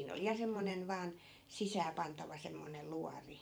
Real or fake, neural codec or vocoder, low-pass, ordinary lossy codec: fake; vocoder, 44.1 kHz, 128 mel bands every 512 samples, BigVGAN v2; none; none